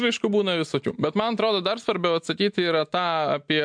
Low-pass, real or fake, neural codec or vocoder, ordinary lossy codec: 9.9 kHz; real; none; MP3, 64 kbps